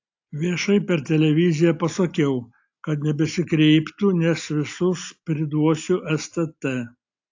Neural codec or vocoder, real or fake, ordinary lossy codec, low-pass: none; real; AAC, 48 kbps; 7.2 kHz